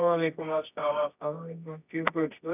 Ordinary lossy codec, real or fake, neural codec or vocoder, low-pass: none; fake; codec, 24 kHz, 0.9 kbps, WavTokenizer, medium music audio release; 3.6 kHz